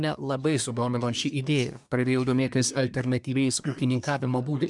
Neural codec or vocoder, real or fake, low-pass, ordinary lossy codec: codec, 24 kHz, 1 kbps, SNAC; fake; 10.8 kHz; MP3, 96 kbps